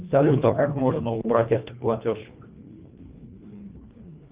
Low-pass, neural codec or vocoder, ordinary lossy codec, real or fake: 3.6 kHz; codec, 24 kHz, 1.5 kbps, HILCodec; Opus, 24 kbps; fake